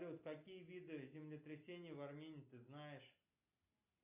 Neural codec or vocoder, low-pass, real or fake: none; 3.6 kHz; real